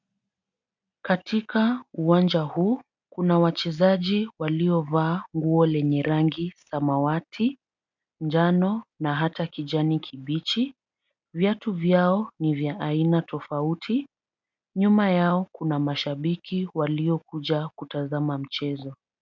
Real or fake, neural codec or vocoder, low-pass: real; none; 7.2 kHz